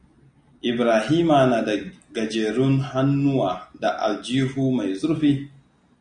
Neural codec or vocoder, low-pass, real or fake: none; 9.9 kHz; real